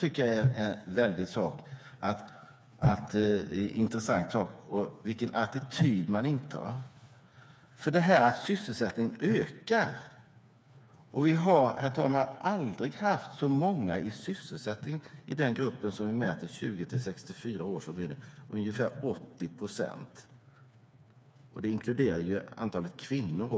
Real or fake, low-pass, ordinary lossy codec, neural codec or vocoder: fake; none; none; codec, 16 kHz, 4 kbps, FreqCodec, smaller model